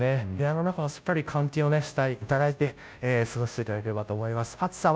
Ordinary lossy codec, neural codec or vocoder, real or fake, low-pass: none; codec, 16 kHz, 0.5 kbps, FunCodec, trained on Chinese and English, 25 frames a second; fake; none